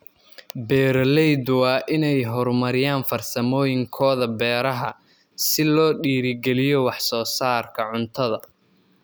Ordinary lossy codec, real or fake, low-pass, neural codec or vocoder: none; real; none; none